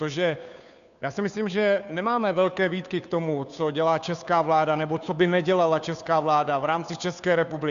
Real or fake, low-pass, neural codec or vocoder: fake; 7.2 kHz; codec, 16 kHz, 2 kbps, FunCodec, trained on Chinese and English, 25 frames a second